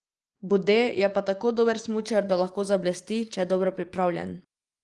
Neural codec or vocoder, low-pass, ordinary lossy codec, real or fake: codec, 44.1 kHz, 7.8 kbps, DAC; 10.8 kHz; Opus, 24 kbps; fake